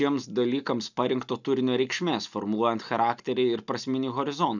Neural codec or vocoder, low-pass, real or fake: none; 7.2 kHz; real